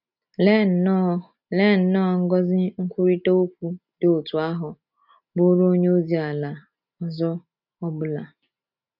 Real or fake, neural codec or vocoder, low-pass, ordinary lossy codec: real; none; 5.4 kHz; none